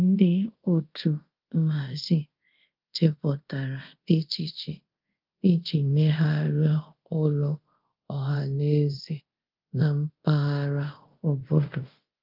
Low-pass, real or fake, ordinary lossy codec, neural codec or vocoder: 5.4 kHz; fake; Opus, 32 kbps; codec, 24 kHz, 0.5 kbps, DualCodec